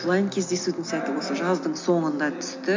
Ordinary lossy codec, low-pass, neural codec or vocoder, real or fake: MP3, 48 kbps; 7.2 kHz; none; real